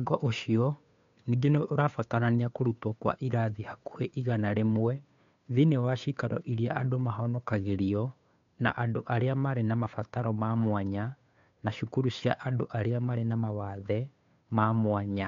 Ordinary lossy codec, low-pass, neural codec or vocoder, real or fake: MP3, 64 kbps; 7.2 kHz; codec, 16 kHz, 2 kbps, FunCodec, trained on Chinese and English, 25 frames a second; fake